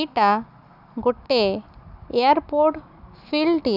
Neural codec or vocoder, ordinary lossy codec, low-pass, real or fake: none; none; 5.4 kHz; real